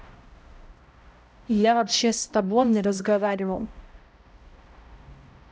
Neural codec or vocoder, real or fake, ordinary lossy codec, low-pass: codec, 16 kHz, 0.5 kbps, X-Codec, HuBERT features, trained on balanced general audio; fake; none; none